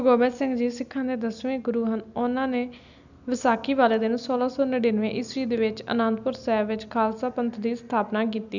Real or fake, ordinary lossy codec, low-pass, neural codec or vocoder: real; none; 7.2 kHz; none